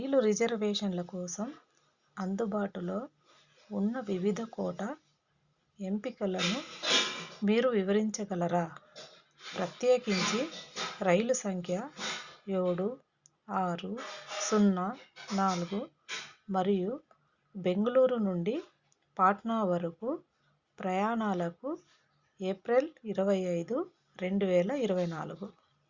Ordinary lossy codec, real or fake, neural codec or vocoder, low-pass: Opus, 64 kbps; real; none; 7.2 kHz